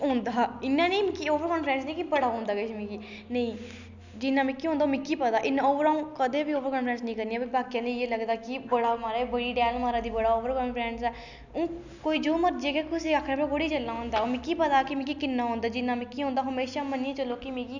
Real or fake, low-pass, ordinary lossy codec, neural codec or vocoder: real; 7.2 kHz; none; none